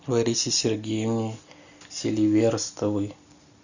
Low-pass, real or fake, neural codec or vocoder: 7.2 kHz; real; none